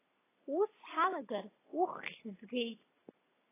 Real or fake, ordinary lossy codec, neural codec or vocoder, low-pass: real; AAC, 16 kbps; none; 3.6 kHz